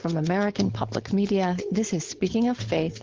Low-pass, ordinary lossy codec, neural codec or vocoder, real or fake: 7.2 kHz; Opus, 16 kbps; codec, 16 kHz, 4.8 kbps, FACodec; fake